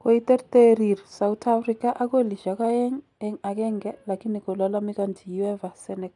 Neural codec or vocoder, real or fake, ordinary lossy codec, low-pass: none; real; none; 10.8 kHz